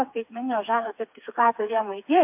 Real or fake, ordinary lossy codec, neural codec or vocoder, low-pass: fake; MP3, 32 kbps; codec, 16 kHz, 4 kbps, FreqCodec, smaller model; 3.6 kHz